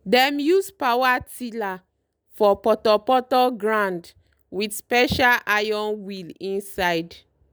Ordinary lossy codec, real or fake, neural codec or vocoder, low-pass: none; real; none; none